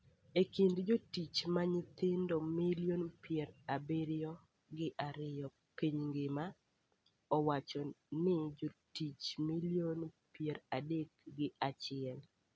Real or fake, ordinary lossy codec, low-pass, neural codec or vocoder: real; none; none; none